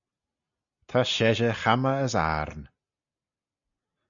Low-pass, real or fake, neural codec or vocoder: 7.2 kHz; real; none